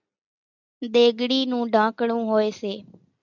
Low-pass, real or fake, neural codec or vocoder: 7.2 kHz; real; none